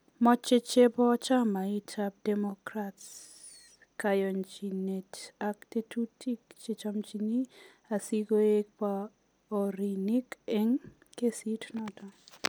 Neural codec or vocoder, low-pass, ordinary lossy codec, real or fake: none; none; none; real